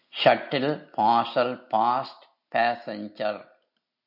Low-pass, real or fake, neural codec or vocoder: 5.4 kHz; real; none